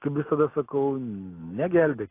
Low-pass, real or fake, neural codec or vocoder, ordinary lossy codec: 3.6 kHz; real; none; AAC, 24 kbps